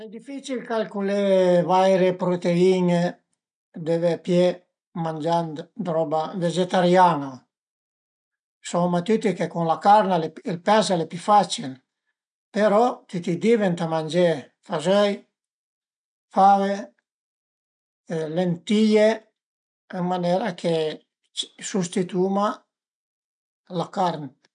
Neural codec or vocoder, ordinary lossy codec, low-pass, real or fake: none; none; 9.9 kHz; real